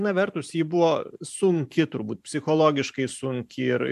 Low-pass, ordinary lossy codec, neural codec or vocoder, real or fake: 14.4 kHz; MP3, 96 kbps; vocoder, 44.1 kHz, 128 mel bands every 512 samples, BigVGAN v2; fake